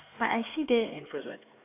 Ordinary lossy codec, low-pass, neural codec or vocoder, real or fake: AAC, 24 kbps; 3.6 kHz; codec, 16 kHz, 4 kbps, X-Codec, HuBERT features, trained on LibriSpeech; fake